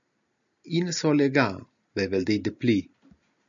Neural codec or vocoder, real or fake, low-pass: none; real; 7.2 kHz